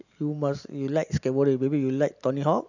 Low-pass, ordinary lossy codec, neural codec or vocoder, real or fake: 7.2 kHz; none; none; real